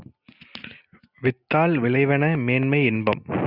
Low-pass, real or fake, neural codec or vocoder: 5.4 kHz; real; none